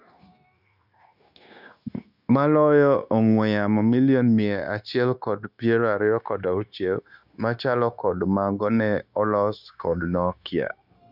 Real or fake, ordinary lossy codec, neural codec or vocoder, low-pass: fake; none; codec, 16 kHz, 0.9 kbps, LongCat-Audio-Codec; 5.4 kHz